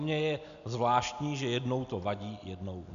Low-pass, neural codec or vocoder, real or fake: 7.2 kHz; none; real